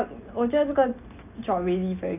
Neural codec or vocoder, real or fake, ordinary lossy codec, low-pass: none; real; none; 3.6 kHz